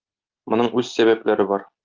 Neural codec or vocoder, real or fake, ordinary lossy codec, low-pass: none; real; Opus, 32 kbps; 7.2 kHz